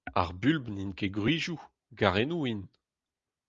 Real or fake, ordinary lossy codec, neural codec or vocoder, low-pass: real; Opus, 32 kbps; none; 7.2 kHz